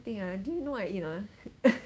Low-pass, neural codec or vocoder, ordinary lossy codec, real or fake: none; codec, 16 kHz, 6 kbps, DAC; none; fake